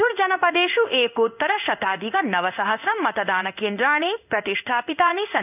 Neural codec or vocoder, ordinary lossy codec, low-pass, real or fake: codec, 16 kHz in and 24 kHz out, 1 kbps, XY-Tokenizer; none; 3.6 kHz; fake